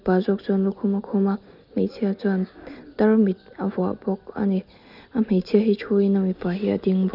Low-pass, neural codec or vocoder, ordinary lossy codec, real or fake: 5.4 kHz; none; none; real